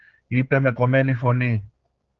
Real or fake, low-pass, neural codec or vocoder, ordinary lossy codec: fake; 7.2 kHz; codec, 16 kHz, 4 kbps, X-Codec, HuBERT features, trained on general audio; Opus, 16 kbps